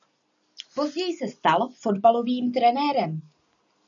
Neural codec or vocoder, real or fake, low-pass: none; real; 7.2 kHz